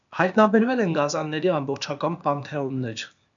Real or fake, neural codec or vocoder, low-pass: fake; codec, 16 kHz, 0.8 kbps, ZipCodec; 7.2 kHz